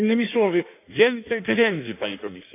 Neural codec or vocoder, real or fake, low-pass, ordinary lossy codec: codec, 16 kHz in and 24 kHz out, 1.1 kbps, FireRedTTS-2 codec; fake; 3.6 kHz; none